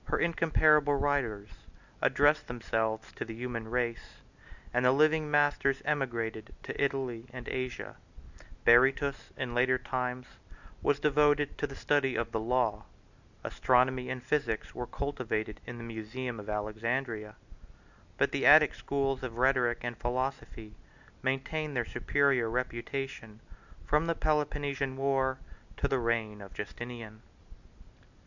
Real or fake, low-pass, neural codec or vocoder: real; 7.2 kHz; none